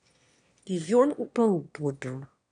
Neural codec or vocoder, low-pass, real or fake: autoencoder, 22.05 kHz, a latent of 192 numbers a frame, VITS, trained on one speaker; 9.9 kHz; fake